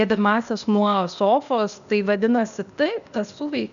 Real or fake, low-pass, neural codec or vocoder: fake; 7.2 kHz; codec, 16 kHz, 0.8 kbps, ZipCodec